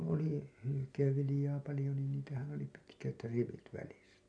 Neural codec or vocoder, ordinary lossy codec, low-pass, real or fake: none; none; 9.9 kHz; real